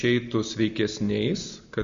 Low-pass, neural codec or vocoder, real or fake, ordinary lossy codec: 7.2 kHz; none; real; AAC, 64 kbps